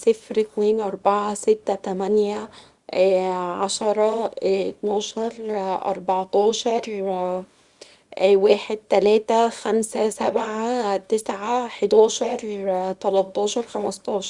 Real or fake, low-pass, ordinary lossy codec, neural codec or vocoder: fake; 10.8 kHz; Opus, 64 kbps; codec, 24 kHz, 0.9 kbps, WavTokenizer, small release